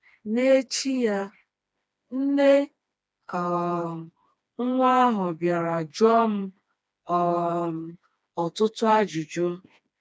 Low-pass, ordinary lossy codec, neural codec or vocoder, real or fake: none; none; codec, 16 kHz, 2 kbps, FreqCodec, smaller model; fake